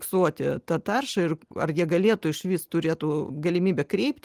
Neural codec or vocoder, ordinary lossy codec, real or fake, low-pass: none; Opus, 24 kbps; real; 14.4 kHz